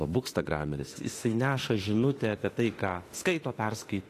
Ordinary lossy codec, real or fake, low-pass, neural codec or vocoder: AAC, 48 kbps; fake; 14.4 kHz; autoencoder, 48 kHz, 32 numbers a frame, DAC-VAE, trained on Japanese speech